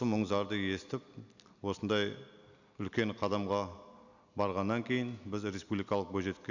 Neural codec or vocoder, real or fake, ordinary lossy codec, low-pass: none; real; none; 7.2 kHz